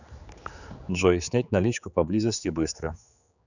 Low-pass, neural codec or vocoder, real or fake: 7.2 kHz; codec, 16 kHz, 4 kbps, X-Codec, HuBERT features, trained on general audio; fake